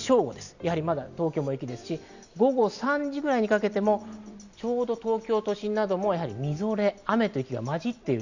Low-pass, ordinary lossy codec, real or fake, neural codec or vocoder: 7.2 kHz; none; real; none